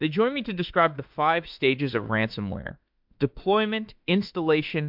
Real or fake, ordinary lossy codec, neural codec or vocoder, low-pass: fake; MP3, 48 kbps; autoencoder, 48 kHz, 32 numbers a frame, DAC-VAE, trained on Japanese speech; 5.4 kHz